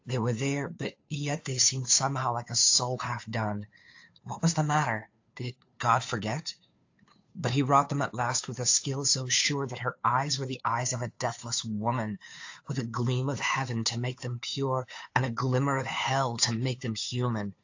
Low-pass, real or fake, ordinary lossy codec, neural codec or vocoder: 7.2 kHz; fake; AAC, 48 kbps; codec, 16 kHz, 2 kbps, FunCodec, trained on Chinese and English, 25 frames a second